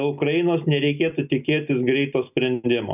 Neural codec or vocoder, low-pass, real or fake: none; 3.6 kHz; real